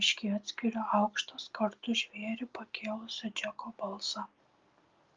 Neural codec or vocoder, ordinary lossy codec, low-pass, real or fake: none; Opus, 32 kbps; 7.2 kHz; real